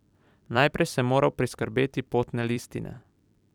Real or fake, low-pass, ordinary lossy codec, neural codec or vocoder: fake; 19.8 kHz; none; vocoder, 44.1 kHz, 128 mel bands every 256 samples, BigVGAN v2